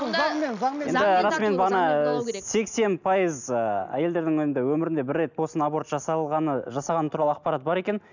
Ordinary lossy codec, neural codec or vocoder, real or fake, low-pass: none; none; real; 7.2 kHz